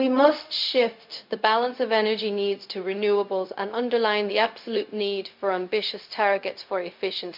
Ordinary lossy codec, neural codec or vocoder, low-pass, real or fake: MP3, 48 kbps; codec, 16 kHz, 0.4 kbps, LongCat-Audio-Codec; 5.4 kHz; fake